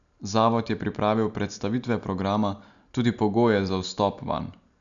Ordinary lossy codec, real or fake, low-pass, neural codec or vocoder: none; real; 7.2 kHz; none